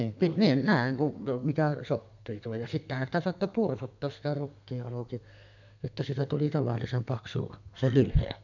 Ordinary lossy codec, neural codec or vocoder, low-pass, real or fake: none; codec, 32 kHz, 1.9 kbps, SNAC; 7.2 kHz; fake